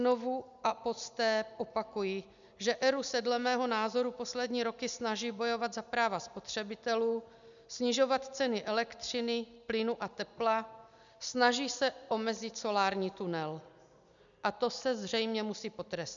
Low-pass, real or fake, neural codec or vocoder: 7.2 kHz; real; none